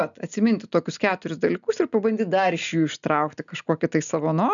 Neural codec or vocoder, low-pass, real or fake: none; 7.2 kHz; real